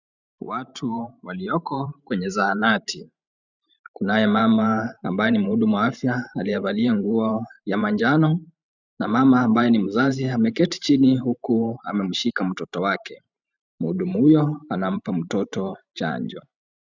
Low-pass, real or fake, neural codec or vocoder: 7.2 kHz; fake; vocoder, 44.1 kHz, 128 mel bands every 512 samples, BigVGAN v2